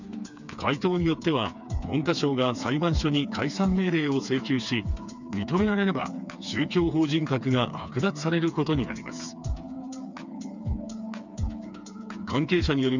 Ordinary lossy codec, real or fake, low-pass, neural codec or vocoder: none; fake; 7.2 kHz; codec, 16 kHz, 4 kbps, FreqCodec, smaller model